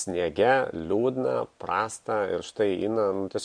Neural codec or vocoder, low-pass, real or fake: none; 9.9 kHz; real